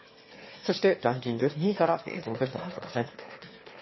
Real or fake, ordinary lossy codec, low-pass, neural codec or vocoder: fake; MP3, 24 kbps; 7.2 kHz; autoencoder, 22.05 kHz, a latent of 192 numbers a frame, VITS, trained on one speaker